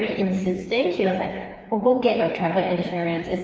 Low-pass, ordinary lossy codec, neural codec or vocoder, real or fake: none; none; codec, 16 kHz, 2 kbps, FreqCodec, larger model; fake